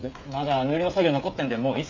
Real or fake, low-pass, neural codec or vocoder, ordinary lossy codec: fake; 7.2 kHz; codec, 16 kHz, 16 kbps, FreqCodec, smaller model; MP3, 64 kbps